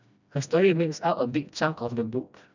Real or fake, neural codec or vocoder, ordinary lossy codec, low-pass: fake; codec, 16 kHz, 1 kbps, FreqCodec, smaller model; none; 7.2 kHz